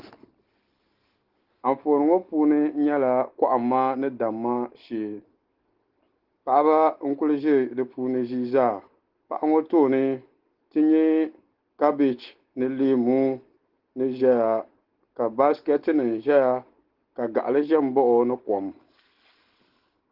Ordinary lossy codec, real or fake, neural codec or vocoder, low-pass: Opus, 16 kbps; real; none; 5.4 kHz